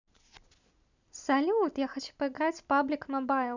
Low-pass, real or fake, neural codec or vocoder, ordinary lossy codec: 7.2 kHz; real; none; none